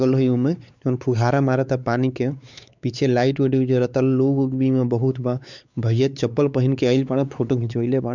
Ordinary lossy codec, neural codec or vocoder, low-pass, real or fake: none; codec, 16 kHz, 4 kbps, X-Codec, WavLM features, trained on Multilingual LibriSpeech; 7.2 kHz; fake